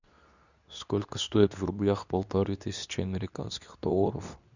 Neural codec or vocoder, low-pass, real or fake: codec, 24 kHz, 0.9 kbps, WavTokenizer, medium speech release version 2; 7.2 kHz; fake